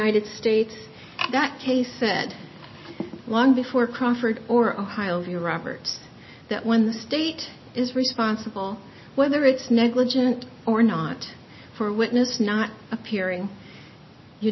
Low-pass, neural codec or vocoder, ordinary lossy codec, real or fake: 7.2 kHz; none; MP3, 24 kbps; real